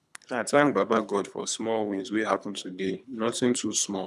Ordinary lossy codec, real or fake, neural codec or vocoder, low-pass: none; fake; codec, 24 kHz, 3 kbps, HILCodec; none